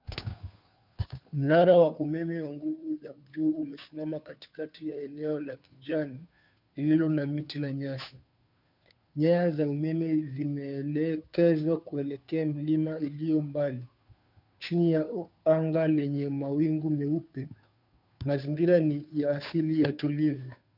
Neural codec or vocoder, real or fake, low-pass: codec, 16 kHz, 2 kbps, FunCodec, trained on Chinese and English, 25 frames a second; fake; 5.4 kHz